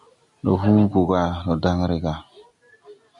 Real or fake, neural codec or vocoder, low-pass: real; none; 10.8 kHz